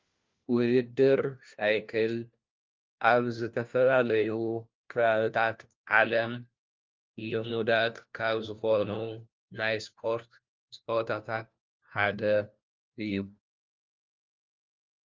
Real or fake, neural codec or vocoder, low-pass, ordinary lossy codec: fake; codec, 16 kHz, 1 kbps, FunCodec, trained on LibriTTS, 50 frames a second; 7.2 kHz; Opus, 24 kbps